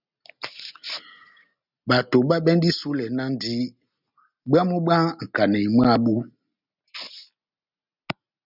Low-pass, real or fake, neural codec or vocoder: 5.4 kHz; real; none